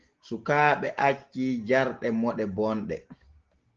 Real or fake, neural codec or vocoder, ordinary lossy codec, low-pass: real; none; Opus, 16 kbps; 7.2 kHz